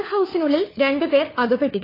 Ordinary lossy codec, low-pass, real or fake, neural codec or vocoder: AAC, 24 kbps; 5.4 kHz; fake; codec, 16 kHz, 4 kbps, FunCodec, trained on LibriTTS, 50 frames a second